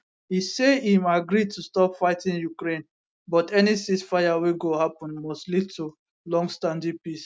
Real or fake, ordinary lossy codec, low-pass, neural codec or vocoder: real; none; none; none